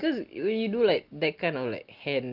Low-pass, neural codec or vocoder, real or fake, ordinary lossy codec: 5.4 kHz; none; real; Opus, 24 kbps